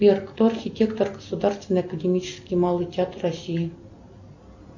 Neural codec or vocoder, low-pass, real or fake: none; 7.2 kHz; real